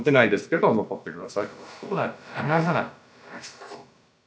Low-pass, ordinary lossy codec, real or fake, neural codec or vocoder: none; none; fake; codec, 16 kHz, about 1 kbps, DyCAST, with the encoder's durations